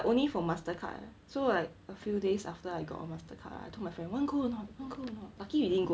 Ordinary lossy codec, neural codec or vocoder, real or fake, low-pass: none; none; real; none